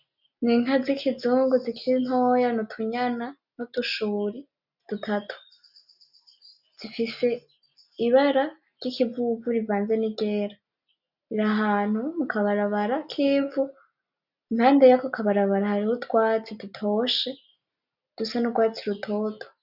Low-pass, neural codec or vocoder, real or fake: 5.4 kHz; none; real